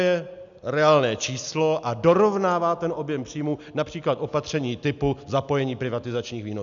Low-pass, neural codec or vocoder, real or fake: 7.2 kHz; none; real